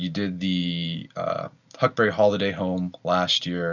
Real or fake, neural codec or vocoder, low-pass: real; none; 7.2 kHz